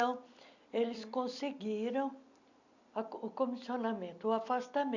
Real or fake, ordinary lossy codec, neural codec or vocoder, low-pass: real; none; none; 7.2 kHz